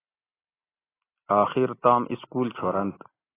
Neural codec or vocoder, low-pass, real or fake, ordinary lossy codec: none; 3.6 kHz; real; AAC, 16 kbps